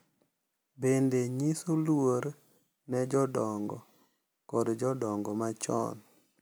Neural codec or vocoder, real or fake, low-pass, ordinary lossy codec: none; real; none; none